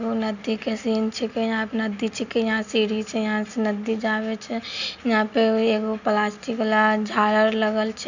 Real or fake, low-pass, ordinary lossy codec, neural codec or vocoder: real; 7.2 kHz; none; none